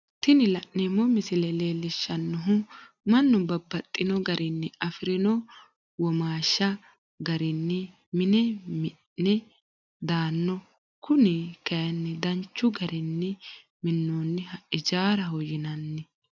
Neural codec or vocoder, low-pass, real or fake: none; 7.2 kHz; real